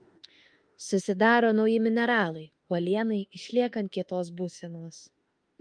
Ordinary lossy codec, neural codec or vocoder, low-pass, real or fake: Opus, 32 kbps; autoencoder, 48 kHz, 32 numbers a frame, DAC-VAE, trained on Japanese speech; 9.9 kHz; fake